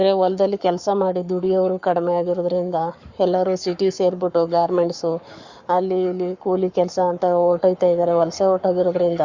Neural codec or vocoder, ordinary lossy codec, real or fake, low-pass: codec, 44.1 kHz, 7.8 kbps, Pupu-Codec; Opus, 64 kbps; fake; 7.2 kHz